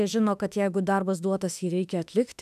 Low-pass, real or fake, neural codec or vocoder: 14.4 kHz; fake; autoencoder, 48 kHz, 32 numbers a frame, DAC-VAE, trained on Japanese speech